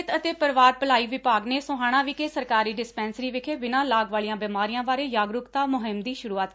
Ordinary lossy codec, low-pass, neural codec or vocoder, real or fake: none; none; none; real